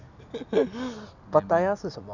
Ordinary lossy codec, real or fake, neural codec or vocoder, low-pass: none; real; none; 7.2 kHz